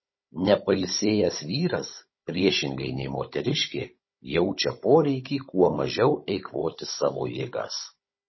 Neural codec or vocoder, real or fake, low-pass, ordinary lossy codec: codec, 16 kHz, 16 kbps, FunCodec, trained on Chinese and English, 50 frames a second; fake; 7.2 kHz; MP3, 24 kbps